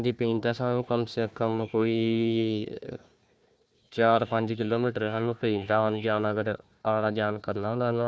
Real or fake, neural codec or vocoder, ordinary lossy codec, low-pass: fake; codec, 16 kHz, 1 kbps, FunCodec, trained on Chinese and English, 50 frames a second; none; none